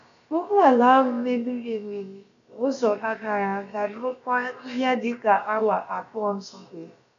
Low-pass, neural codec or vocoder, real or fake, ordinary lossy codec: 7.2 kHz; codec, 16 kHz, about 1 kbps, DyCAST, with the encoder's durations; fake; none